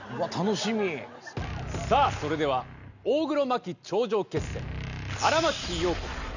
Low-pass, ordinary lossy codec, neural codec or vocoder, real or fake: 7.2 kHz; none; none; real